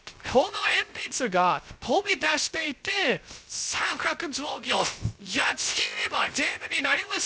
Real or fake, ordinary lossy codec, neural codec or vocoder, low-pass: fake; none; codec, 16 kHz, 0.3 kbps, FocalCodec; none